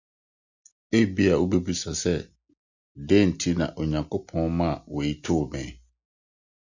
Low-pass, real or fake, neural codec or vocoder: 7.2 kHz; real; none